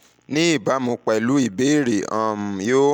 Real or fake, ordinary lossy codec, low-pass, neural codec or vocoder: real; none; 19.8 kHz; none